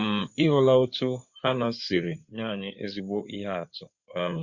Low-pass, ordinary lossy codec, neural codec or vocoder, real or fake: 7.2 kHz; Opus, 64 kbps; codec, 16 kHz in and 24 kHz out, 2.2 kbps, FireRedTTS-2 codec; fake